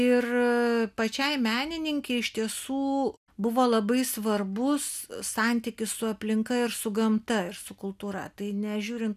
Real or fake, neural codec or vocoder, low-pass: real; none; 14.4 kHz